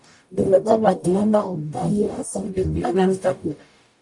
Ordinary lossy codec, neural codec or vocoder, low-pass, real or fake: AAC, 64 kbps; codec, 44.1 kHz, 0.9 kbps, DAC; 10.8 kHz; fake